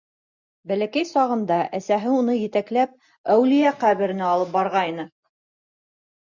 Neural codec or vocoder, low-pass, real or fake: none; 7.2 kHz; real